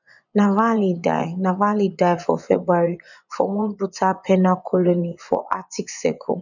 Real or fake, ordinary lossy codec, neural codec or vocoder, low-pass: fake; none; vocoder, 24 kHz, 100 mel bands, Vocos; 7.2 kHz